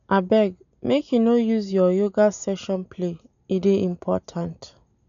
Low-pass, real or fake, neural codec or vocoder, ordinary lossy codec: 7.2 kHz; real; none; none